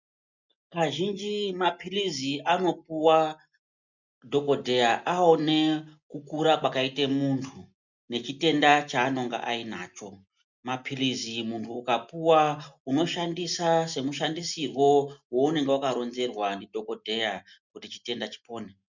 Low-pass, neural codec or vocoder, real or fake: 7.2 kHz; none; real